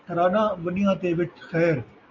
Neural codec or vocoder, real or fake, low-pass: none; real; 7.2 kHz